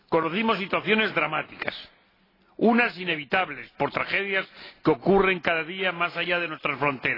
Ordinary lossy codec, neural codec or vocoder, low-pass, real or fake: AAC, 24 kbps; none; 5.4 kHz; real